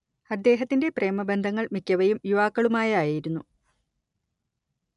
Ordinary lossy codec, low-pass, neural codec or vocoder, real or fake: none; 10.8 kHz; none; real